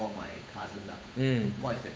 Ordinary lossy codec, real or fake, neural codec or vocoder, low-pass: none; fake; codec, 16 kHz, 8 kbps, FunCodec, trained on Chinese and English, 25 frames a second; none